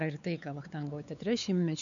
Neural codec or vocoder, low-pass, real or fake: codec, 16 kHz, 4 kbps, X-Codec, WavLM features, trained on Multilingual LibriSpeech; 7.2 kHz; fake